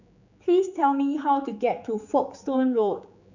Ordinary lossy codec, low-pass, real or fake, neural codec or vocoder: none; 7.2 kHz; fake; codec, 16 kHz, 4 kbps, X-Codec, HuBERT features, trained on general audio